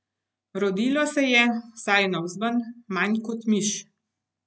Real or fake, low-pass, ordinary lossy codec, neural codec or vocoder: real; none; none; none